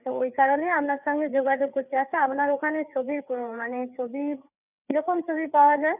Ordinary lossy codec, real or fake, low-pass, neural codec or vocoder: none; fake; 3.6 kHz; codec, 16 kHz, 4 kbps, FreqCodec, larger model